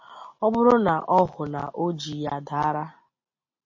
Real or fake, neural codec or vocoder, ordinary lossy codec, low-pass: real; none; MP3, 32 kbps; 7.2 kHz